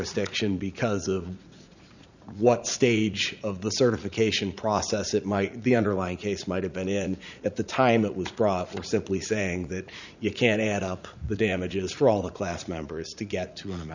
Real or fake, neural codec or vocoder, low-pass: fake; vocoder, 44.1 kHz, 80 mel bands, Vocos; 7.2 kHz